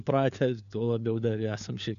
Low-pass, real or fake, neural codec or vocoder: 7.2 kHz; fake; codec, 16 kHz, 4 kbps, FunCodec, trained on LibriTTS, 50 frames a second